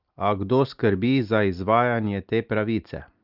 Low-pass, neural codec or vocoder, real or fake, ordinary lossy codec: 5.4 kHz; none; real; Opus, 32 kbps